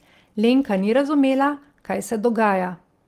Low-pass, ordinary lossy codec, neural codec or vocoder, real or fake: 14.4 kHz; Opus, 24 kbps; none; real